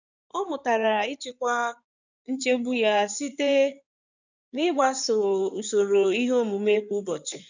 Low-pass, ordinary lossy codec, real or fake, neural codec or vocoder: 7.2 kHz; none; fake; codec, 16 kHz in and 24 kHz out, 2.2 kbps, FireRedTTS-2 codec